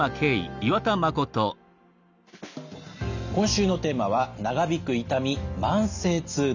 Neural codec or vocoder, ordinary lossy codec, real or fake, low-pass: none; none; real; 7.2 kHz